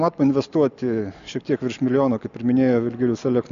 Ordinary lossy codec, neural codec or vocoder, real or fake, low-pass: AAC, 96 kbps; none; real; 7.2 kHz